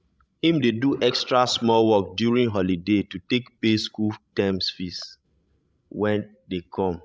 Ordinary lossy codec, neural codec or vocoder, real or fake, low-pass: none; codec, 16 kHz, 16 kbps, FreqCodec, larger model; fake; none